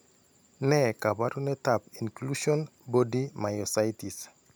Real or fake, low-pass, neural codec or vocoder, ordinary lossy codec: real; none; none; none